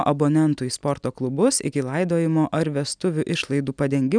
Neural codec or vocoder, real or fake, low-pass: none; real; 9.9 kHz